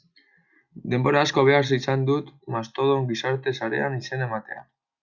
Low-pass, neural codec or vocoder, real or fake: 7.2 kHz; none; real